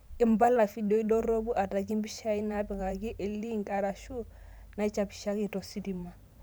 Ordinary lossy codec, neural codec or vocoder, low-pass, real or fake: none; vocoder, 44.1 kHz, 128 mel bands every 512 samples, BigVGAN v2; none; fake